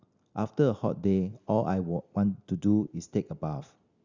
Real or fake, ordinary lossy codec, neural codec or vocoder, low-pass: real; Opus, 64 kbps; none; 7.2 kHz